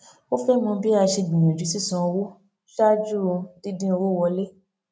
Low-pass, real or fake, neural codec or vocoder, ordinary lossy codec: none; real; none; none